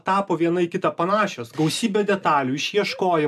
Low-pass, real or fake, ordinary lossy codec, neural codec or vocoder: 14.4 kHz; real; MP3, 96 kbps; none